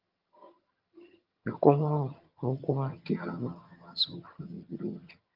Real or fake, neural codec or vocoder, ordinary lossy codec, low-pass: fake; vocoder, 22.05 kHz, 80 mel bands, HiFi-GAN; Opus, 24 kbps; 5.4 kHz